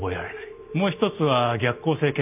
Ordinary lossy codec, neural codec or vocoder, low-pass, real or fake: none; none; 3.6 kHz; real